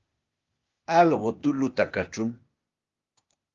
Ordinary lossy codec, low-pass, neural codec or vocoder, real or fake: Opus, 32 kbps; 7.2 kHz; codec, 16 kHz, 0.8 kbps, ZipCodec; fake